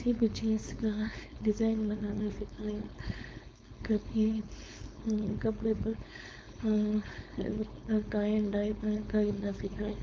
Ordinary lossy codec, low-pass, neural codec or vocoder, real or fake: none; none; codec, 16 kHz, 4.8 kbps, FACodec; fake